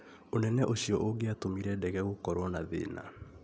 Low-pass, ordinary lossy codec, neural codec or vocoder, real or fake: none; none; none; real